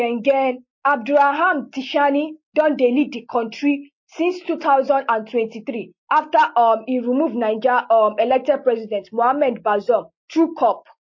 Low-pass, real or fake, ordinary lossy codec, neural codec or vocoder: 7.2 kHz; real; MP3, 32 kbps; none